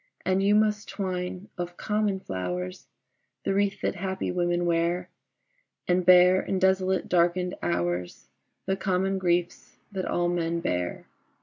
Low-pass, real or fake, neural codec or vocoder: 7.2 kHz; real; none